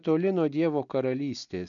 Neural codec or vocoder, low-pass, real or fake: none; 7.2 kHz; real